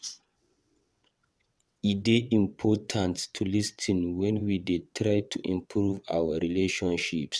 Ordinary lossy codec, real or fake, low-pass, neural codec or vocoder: none; fake; none; vocoder, 22.05 kHz, 80 mel bands, WaveNeXt